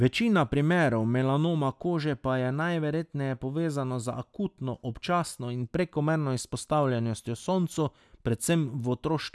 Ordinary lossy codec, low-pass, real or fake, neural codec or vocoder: none; none; real; none